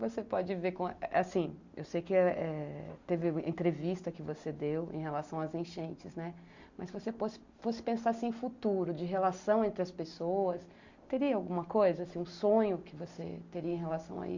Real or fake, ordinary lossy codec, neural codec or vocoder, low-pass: real; Opus, 64 kbps; none; 7.2 kHz